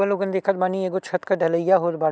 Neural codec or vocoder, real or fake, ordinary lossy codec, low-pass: none; real; none; none